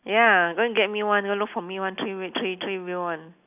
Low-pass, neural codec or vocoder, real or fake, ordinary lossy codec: 3.6 kHz; none; real; none